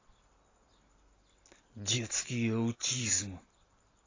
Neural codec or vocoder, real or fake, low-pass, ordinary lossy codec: none; real; 7.2 kHz; AAC, 32 kbps